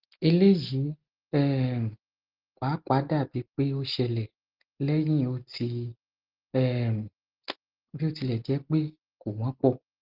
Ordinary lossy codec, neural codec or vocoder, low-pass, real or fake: Opus, 16 kbps; none; 5.4 kHz; real